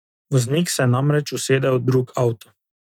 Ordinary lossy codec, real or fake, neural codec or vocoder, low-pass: none; real; none; 19.8 kHz